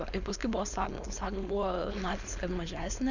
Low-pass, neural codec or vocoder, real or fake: 7.2 kHz; codec, 16 kHz, 4.8 kbps, FACodec; fake